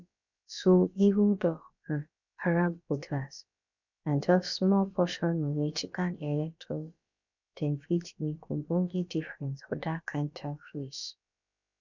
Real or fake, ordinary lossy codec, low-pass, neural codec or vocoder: fake; none; 7.2 kHz; codec, 16 kHz, about 1 kbps, DyCAST, with the encoder's durations